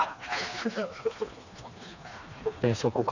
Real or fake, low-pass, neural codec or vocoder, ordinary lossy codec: fake; 7.2 kHz; codec, 16 kHz, 2 kbps, FreqCodec, smaller model; none